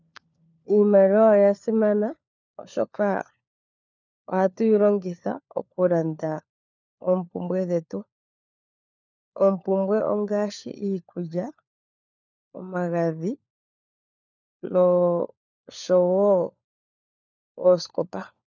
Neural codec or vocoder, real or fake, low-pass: codec, 16 kHz, 4 kbps, FunCodec, trained on LibriTTS, 50 frames a second; fake; 7.2 kHz